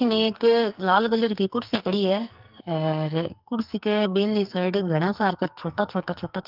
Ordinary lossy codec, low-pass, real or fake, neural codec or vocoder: Opus, 32 kbps; 5.4 kHz; fake; codec, 44.1 kHz, 2.6 kbps, SNAC